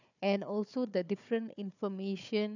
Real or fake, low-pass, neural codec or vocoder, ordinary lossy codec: fake; 7.2 kHz; codec, 16 kHz, 4 kbps, FunCodec, trained on Chinese and English, 50 frames a second; none